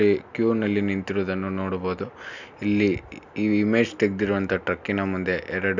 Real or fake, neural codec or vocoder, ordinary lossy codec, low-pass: real; none; none; 7.2 kHz